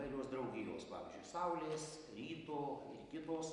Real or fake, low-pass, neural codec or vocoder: real; 10.8 kHz; none